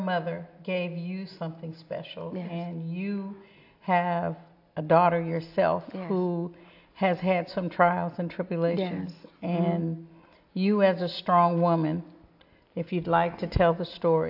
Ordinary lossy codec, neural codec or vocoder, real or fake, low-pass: AAC, 48 kbps; none; real; 5.4 kHz